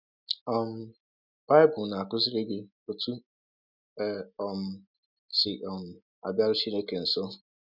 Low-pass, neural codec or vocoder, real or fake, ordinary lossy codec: 5.4 kHz; none; real; none